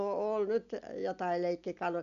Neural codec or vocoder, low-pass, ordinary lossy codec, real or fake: none; 7.2 kHz; none; real